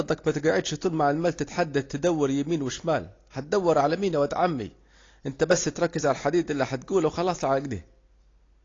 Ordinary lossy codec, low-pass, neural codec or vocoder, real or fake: AAC, 32 kbps; 7.2 kHz; none; real